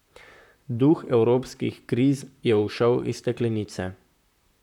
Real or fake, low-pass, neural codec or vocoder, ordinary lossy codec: fake; 19.8 kHz; vocoder, 44.1 kHz, 128 mel bands, Pupu-Vocoder; none